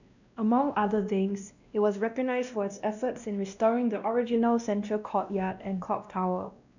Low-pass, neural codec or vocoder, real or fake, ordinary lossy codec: 7.2 kHz; codec, 16 kHz, 1 kbps, X-Codec, WavLM features, trained on Multilingual LibriSpeech; fake; none